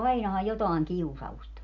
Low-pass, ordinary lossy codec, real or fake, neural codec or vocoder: 7.2 kHz; none; real; none